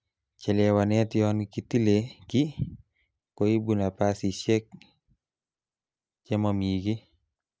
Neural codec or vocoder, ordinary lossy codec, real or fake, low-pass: none; none; real; none